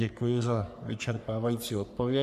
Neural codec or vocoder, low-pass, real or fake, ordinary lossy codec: codec, 44.1 kHz, 3.4 kbps, Pupu-Codec; 14.4 kHz; fake; MP3, 96 kbps